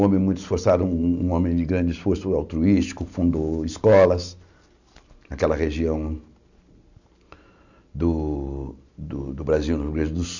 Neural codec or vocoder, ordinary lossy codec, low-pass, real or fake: none; none; 7.2 kHz; real